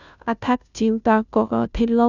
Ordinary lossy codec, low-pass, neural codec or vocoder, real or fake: none; 7.2 kHz; codec, 16 kHz, 0.5 kbps, FunCodec, trained on Chinese and English, 25 frames a second; fake